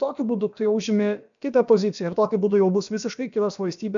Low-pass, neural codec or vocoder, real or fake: 7.2 kHz; codec, 16 kHz, about 1 kbps, DyCAST, with the encoder's durations; fake